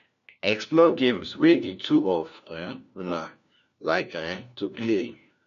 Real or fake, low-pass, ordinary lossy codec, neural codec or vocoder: fake; 7.2 kHz; none; codec, 16 kHz, 1 kbps, FunCodec, trained on LibriTTS, 50 frames a second